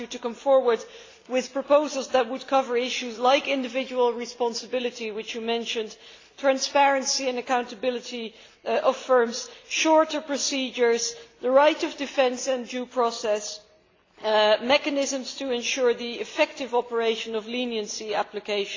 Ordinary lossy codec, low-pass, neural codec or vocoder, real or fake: AAC, 32 kbps; 7.2 kHz; none; real